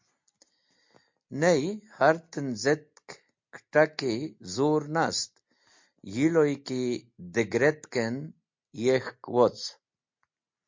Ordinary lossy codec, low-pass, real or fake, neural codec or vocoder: MP3, 48 kbps; 7.2 kHz; real; none